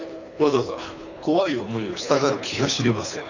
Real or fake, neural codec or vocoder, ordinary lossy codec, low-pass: fake; codec, 24 kHz, 3 kbps, HILCodec; none; 7.2 kHz